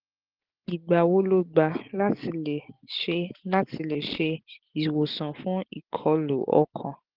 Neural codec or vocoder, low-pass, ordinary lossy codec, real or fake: none; 5.4 kHz; Opus, 16 kbps; real